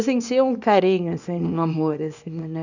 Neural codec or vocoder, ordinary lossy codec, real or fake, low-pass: codec, 24 kHz, 0.9 kbps, WavTokenizer, small release; none; fake; 7.2 kHz